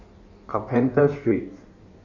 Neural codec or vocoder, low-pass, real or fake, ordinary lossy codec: codec, 16 kHz in and 24 kHz out, 1.1 kbps, FireRedTTS-2 codec; 7.2 kHz; fake; none